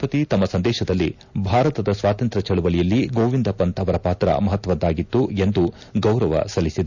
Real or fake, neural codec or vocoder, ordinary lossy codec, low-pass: real; none; none; 7.2 kHz